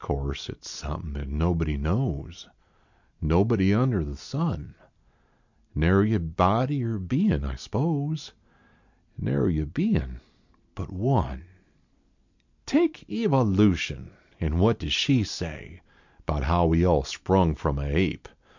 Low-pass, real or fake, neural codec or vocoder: 7.2 kHz; real; none